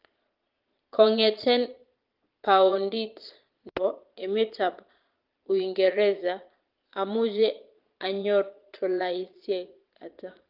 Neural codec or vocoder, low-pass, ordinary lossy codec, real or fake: vocoder, 22.05 kHz, 80 mel bands, Vocos; 5.4 kHz; Opus, 32 kbps; fake